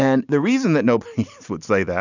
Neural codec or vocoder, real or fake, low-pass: none; real; 7.2 kHz